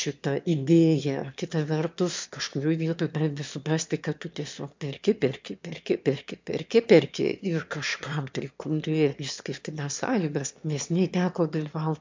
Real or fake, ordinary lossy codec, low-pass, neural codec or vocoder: fake; MP3, 64 kbps; 7.2 kHz; autoencoder, 22.05 kHz, a latent of 192 numbers a frame, VITS, trained on one speaker